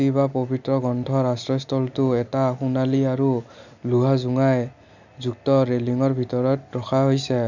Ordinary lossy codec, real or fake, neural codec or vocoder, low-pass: none; real; none; 7.2 kHz